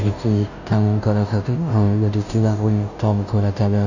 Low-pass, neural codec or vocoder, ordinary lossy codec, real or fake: 7.2 kHz; codec, 16 kHz, 0.5 kbps, FunCodec, trained on Chinese and English, 25 frames a second; none; fake